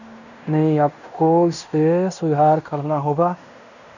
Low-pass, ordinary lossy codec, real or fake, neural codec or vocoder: 7.2 kHz; none; fake; codec, 16 kHz in and 24 kHz out, 0.9 kbps, LongCat-Audio-Codec, fine tuned four codebook decoder